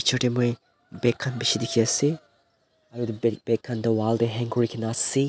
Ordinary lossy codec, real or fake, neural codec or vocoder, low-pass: none; real; none; none